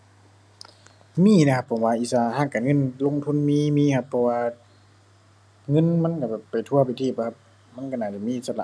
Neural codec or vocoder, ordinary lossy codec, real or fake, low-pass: none; none; real; none